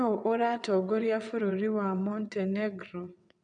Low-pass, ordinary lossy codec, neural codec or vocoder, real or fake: 9.9 kHz; none; vocoder, 22.05 kHz, 80 mel bands, WaveNeXt; fake